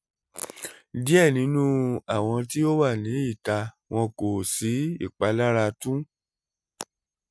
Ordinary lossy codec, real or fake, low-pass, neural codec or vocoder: none; real; none; none